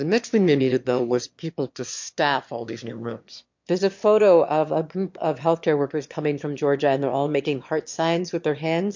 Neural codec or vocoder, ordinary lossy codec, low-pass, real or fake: autoencoder, 22.05 kHz, a latent of 192 numbers a frame, VITS, trained on one speaker; MP3, 48 kbps; 7.2 kHz; fake